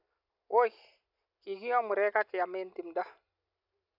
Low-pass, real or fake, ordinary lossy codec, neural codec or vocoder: 5.4 kHz; real; none; none